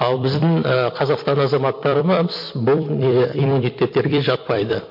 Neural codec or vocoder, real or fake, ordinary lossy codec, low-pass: vocoder, 44.1 kHz, 128 mel bands every 256 samples, BigVGAN v2; fake; MP3, 32 kbps; 5.4 kHz